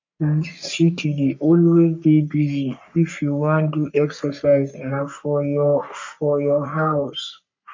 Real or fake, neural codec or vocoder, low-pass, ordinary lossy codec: fake; codec, 44.1 kHz, 3.4 kbps, Pupu-Codec; 7.2 kHz; MP3, 64 kbps